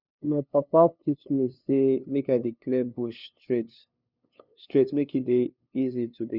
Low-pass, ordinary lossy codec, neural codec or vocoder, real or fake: 5.4 kHz; none; codec, 16 kHz, 2 kbps, FunCodec, trained on LibriTTS, 25 frames a second; fake